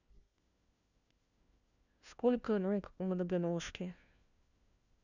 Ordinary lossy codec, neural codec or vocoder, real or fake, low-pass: none; codec, 16 kHz, 1 kbps, FunCodec, trained on LibriTTS, 50 frames a second; fake; 7.2 kHz